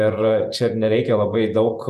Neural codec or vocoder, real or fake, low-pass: vocoder, 48 kHz, 128 mel bands, Vocos; fake; 14.4 kHz